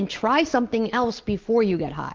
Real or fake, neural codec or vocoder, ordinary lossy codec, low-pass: real; none; Opus, 16 kbps; 7.2 kHz